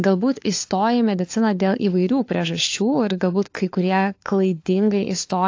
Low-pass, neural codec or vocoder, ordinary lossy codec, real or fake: 7.2 kHz; codec, 16 kHz, 4 kbps, FunCodec, trained on LibriTTS, 50 frames a second; AAC, 48 kbps; fake